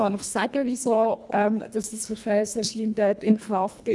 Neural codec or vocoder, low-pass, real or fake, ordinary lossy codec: codec, 24 kHz, 1.5 kbps, HILCodec; none; fake; none